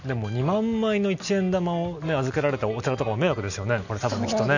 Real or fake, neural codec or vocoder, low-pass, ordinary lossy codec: real; none; 7.2 kHz; none